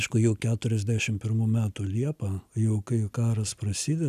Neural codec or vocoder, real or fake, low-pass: none; real; 14.4 kHz